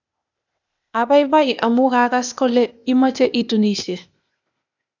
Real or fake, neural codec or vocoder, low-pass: fake; codec, 16 kHz, 0.8 kbps, ZipCodec; 7.2 kHz